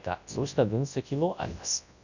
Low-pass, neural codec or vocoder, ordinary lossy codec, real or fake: 7.2 kHz; codec, 24 kHz, 0.9 kbps, WavTokenizer, large speech release; none; fake